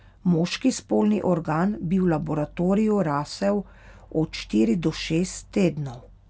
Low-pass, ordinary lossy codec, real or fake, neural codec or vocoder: none; none; real; none